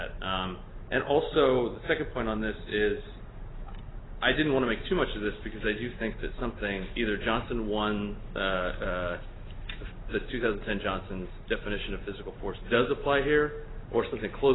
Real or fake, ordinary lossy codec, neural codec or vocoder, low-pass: real; AAC, 16 kbps; none; 7.2 kHz